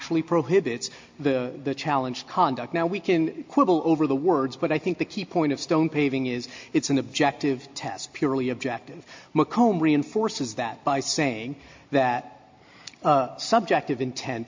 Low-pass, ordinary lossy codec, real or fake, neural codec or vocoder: 7.2 kHz; MP3, 48 kbps; real; none